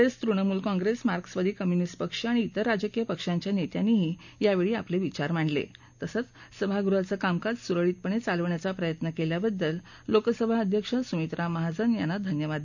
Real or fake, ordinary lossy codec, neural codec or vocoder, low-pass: real; none; none; 7.2 kHz